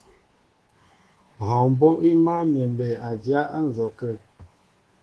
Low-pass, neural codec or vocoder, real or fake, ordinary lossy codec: 10.8 kHz; codec, 24 kHz, 1.2 kbps, DualCodec; fake; Opus, 16 kbps